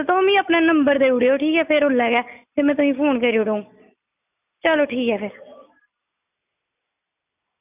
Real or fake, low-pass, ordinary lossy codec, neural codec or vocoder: real; 3.6 kHz; none; none